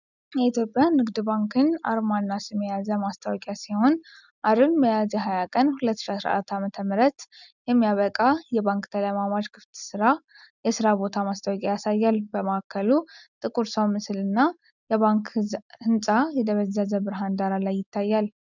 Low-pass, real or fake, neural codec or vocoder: 7.2 kHz; real; none